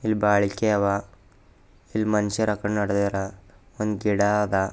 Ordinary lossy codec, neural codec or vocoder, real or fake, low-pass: none; none; real; none